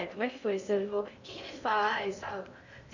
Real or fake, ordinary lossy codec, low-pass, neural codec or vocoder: fake; none; 7.2 kHz; codec, 16 kHz in and 24 kHz out, 0.6 kbps, FocalCodec, streaming, 4096 codes